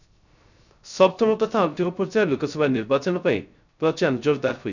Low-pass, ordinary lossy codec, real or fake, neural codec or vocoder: 7.2 kHz; none; fake; codec, 16 kHz, 0.3 kbps, FocalCodec